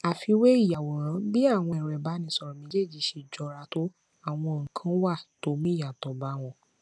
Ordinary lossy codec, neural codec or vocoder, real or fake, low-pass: none; none; real; none